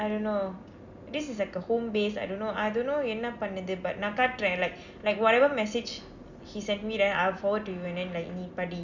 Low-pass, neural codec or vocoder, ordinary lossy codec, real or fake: 7.2 kHz; none; none; real